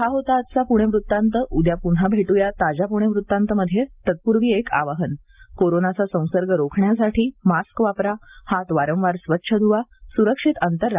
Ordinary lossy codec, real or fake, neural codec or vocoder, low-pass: Opus, 24 kbps; real; none; 3.6 kHz